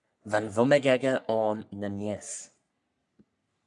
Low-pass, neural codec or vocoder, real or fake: 10.8 kHz; codec, 44.1 kHz, 3.4 kbps, Pupu-Codec; fake